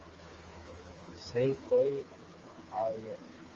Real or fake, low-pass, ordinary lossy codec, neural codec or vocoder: fake; 7.2 kHz; Opus, 32 kbps; codec, 16 kHz, 4 kbps, FreqCodec, smaller model